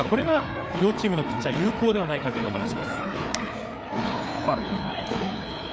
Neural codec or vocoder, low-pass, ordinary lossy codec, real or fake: codec, 16 kHz, 4 kbps, FreqCodec, larger model; none; none; fake